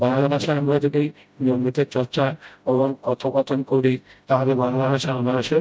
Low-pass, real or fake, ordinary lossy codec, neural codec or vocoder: none; fake; none; codec, 16 kHz, 0.5 kbps, FreqCodec, smaller model